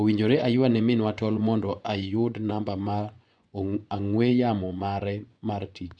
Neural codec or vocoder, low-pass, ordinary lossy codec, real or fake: vocoder, 24 kHz, 100 mel bands, Vocos; 9.9 kHz; none; fake